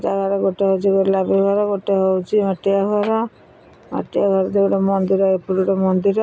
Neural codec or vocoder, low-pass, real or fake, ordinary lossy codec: none; none; real; none